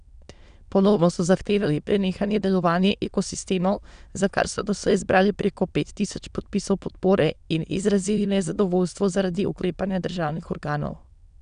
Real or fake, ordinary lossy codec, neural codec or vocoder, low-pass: fake; none; autoencoder, 22.05 kHz, a latent of 192 numbers a frame, VITS, trained on many speakers; 9.9 kHz